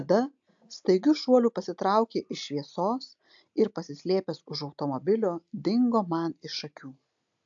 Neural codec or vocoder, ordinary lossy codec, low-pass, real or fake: none; AAC, 64 kbps; 7.2 kHz; real